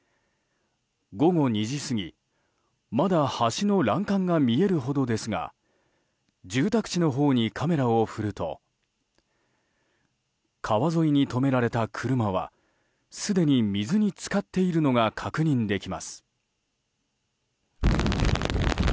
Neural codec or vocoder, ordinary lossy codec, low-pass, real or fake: none; none; none; real